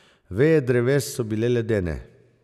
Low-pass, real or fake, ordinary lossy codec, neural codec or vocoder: 14.4 kHz; real; none; none